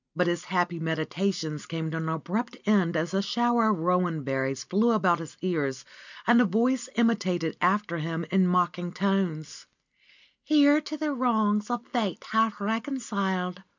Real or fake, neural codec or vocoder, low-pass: real; none; 7.2 kHz